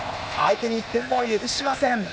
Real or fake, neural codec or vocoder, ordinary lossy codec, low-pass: fake; codec, 16 kHz, 0.8 kbps, ZipCodec; none; none